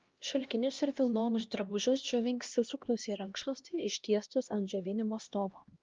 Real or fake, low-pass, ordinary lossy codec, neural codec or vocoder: fake; 7.2 kHz; Opus, 32 kbps; codec, 16 kHz, 1 kbps, X-Codec, HuBERT features, trained on LibriSpeech